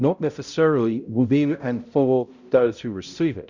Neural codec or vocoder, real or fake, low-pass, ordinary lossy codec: codec, 16 kHz, 0.5 kbps, X-Codec, HuBERT features, trained on balanced general audio; fake; 7.2 kHz; Opus, 64 kbps